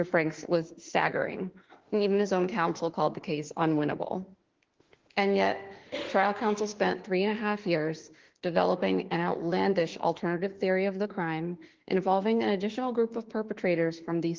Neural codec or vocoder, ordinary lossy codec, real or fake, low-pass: autoencoder, 48 kHz, 32 numbers a frame, DAC-VAE, trained on Japanese speech; Opus, 16 kbps; fake; 7.2 kHz